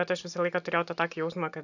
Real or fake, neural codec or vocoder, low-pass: real; none; 7.2 kHz